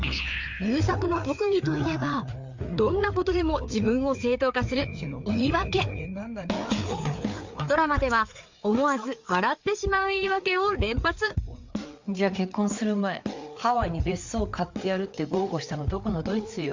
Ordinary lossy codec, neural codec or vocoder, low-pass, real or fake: MP3, 64 kbps; codec, 16 kHz, 4 kbps, FreqCodec, larger model; 7.2 kHz; fake